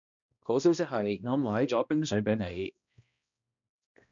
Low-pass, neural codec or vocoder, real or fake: 7.2 kHz; codec, 16 kHz, 1 kbps, X-Codec, HuBERT features, trained on balanced general audio; fake